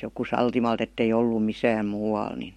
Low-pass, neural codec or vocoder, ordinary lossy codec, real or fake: 19.8 kHz; none; MP3, 64 kbps; real